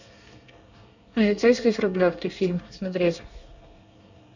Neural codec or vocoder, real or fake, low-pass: codec, 24 kHz, 1 kbps, SNAC; fake; 7.2 kHz